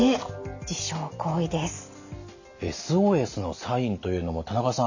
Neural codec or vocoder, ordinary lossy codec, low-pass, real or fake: none; none; 7.2 kHz; real